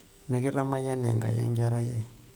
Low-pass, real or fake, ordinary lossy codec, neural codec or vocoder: none; fake; none; codec, 44.1 kHz, 2.6 kbps, SNAC